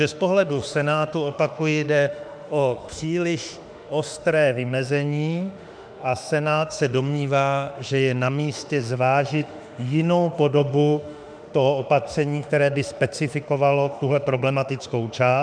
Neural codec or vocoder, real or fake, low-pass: autoencoder, 48 kHz, 32 numbers a frame, DAC-VAE, trained on Japanese speech; fake; 9.9 kHz